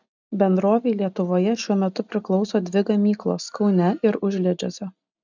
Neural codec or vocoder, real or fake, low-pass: none; real; 7.2 kHz